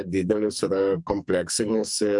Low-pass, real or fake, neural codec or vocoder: 10.8 kHz; fake; codec, 44.1 kHz, 2.6 kbps, SNAC